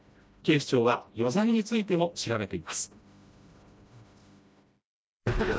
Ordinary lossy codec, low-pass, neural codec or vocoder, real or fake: none; none; codec, 16 kHz, 1 kbps, FreqCodec, smaller model; fake